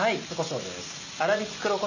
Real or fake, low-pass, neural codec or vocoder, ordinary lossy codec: real; 7.2 kHz; none; none